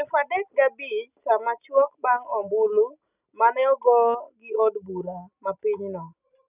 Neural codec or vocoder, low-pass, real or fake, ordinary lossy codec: none; 3.6 kHz; real; none